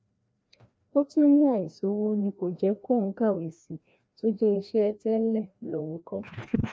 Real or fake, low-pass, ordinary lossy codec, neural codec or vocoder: fake; none; none; codec, 16 kHz, 1 kbps, FreqCodec, larger model